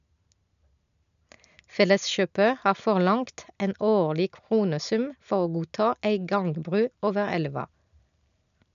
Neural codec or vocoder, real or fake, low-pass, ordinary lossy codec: none; real; 7.2 kHz; none